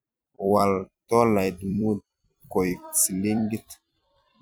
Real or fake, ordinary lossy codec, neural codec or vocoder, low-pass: fake; none; vocoder, 44.1 kHz, 128 mel bands every 256 samples, BigVGAN v2; none